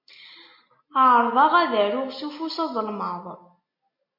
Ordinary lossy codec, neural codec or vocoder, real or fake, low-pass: MP3, 32 kbps; none; real; 5.4 kHz